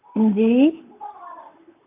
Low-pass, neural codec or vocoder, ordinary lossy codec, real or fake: 3.6 kHz; vocoder, 44.1 kHz, 128 mel bands, Pupu-Vocoder; MP3, 32 kbps; fake